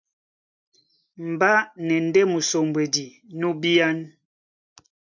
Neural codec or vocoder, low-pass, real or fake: none; 7.2 kHz; real